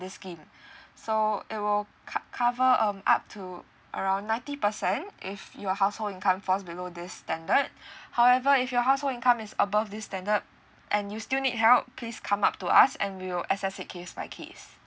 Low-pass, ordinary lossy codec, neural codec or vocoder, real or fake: none; none; none; real